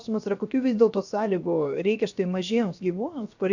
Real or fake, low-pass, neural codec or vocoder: fake; 7.2 kHz; codec, 16 kHz, 0.7 kbps, FocalCodec